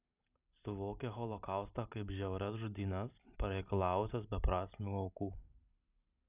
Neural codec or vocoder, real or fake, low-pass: none; real; 3.6 kHz